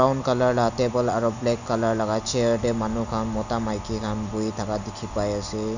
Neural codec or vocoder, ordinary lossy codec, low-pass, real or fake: none; none; 7.2 kHz; real